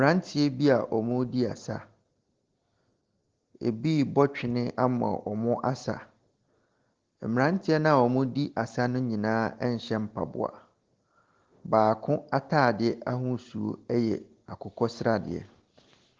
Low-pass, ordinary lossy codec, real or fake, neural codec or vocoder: 7.2 kHz; Opus, 16 kbps; real; none